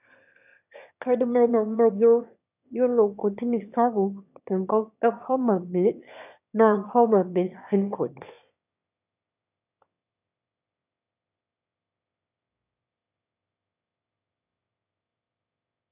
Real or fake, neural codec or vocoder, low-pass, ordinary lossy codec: fake; autoencoder, 22.05 kHz, a latent of 192 numbers a frame, VITS, trained on one speaker; 3.6 kHz; none